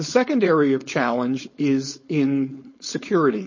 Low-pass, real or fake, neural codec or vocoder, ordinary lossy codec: 7.2 kHz; fake; codec, 16 kHz, 4.8 kbps, FACodec; MP3, 32 kbps